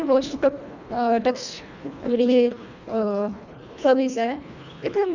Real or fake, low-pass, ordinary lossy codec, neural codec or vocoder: fake; 7.2 kHz; none; codec, 24 kHz, 1.5 kbps, HILCodec